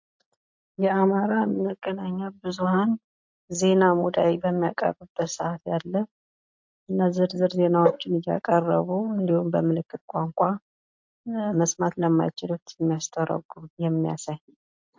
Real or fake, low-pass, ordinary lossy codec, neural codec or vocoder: real; 7.2 kHz; MP3, 48 kbps; none